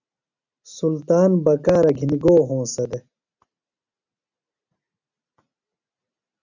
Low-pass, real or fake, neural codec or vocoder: 7.2 kHz; real; none